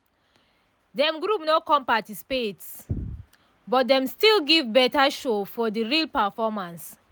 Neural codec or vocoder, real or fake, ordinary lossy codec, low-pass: none; real; none; none